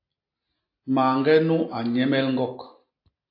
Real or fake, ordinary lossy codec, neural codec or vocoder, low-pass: real; AAC, 32 kbps; none; 5.4 kHz